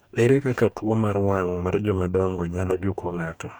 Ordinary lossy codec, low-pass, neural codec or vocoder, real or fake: none; none; codec, 44.1 kHz, 2.6 kbps, DAC; fake